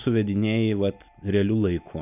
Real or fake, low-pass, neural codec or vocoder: real; 3.6 kHz; none